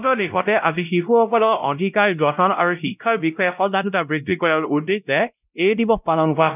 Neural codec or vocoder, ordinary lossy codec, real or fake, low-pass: codec, 16 kHz, 0.5 kbps, X-Codec, WavLM features, trained on Multilingual LibriSpeech; none; fake; 3.6 kHz